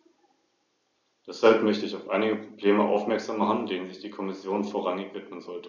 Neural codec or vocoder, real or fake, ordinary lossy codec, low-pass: none; real; none; 7.2 kHz